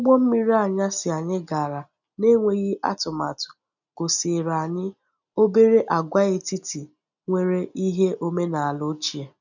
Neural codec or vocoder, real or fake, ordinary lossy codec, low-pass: none; real; none; 7.2 kHz